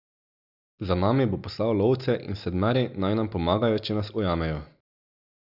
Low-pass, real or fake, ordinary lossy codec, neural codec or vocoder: 5.4 kHz; real; none; none